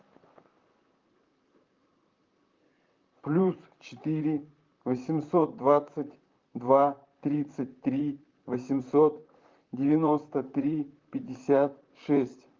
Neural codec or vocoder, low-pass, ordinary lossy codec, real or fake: vocoder, 44.1 kHz, 128 mel bands, Pupu-Vocoder; 7.2 kHz; Opus, 24 kbps; fake